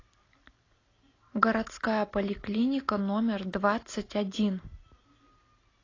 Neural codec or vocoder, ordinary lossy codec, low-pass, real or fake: none; AAC, 32 kbps; 7.2 kHz; real